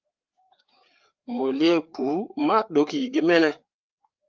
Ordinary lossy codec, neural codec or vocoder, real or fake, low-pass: Opus, 32 kbps; codec, 16 kHz, 4 kbps, FreqCodec, larger model; fake; 7.2 kHz